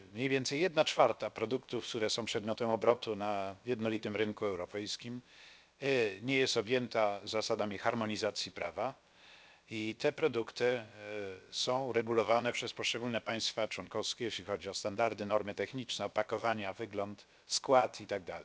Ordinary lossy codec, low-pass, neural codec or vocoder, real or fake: none; none; codec, 16 kHz, about 1 kbps, DyCAST, with the encoder's durations; fake